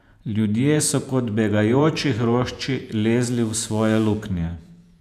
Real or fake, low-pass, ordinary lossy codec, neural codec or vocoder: fake; 14.4 kHz; none; vocoder, 48 kHz, 128 mel bands, Vocos